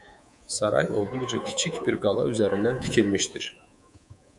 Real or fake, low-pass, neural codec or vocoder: fake; 10.8 kHz; codec, 24 kHz, 3.1 kbps, DualCodec